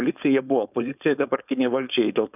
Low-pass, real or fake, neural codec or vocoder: 3.6 kHz; fake; codec, 16 kHz, 4.8 kbps, FACodec